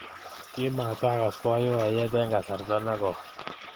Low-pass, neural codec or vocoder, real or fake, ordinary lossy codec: 19.8 kHz; none; real; Opus, 16 kbps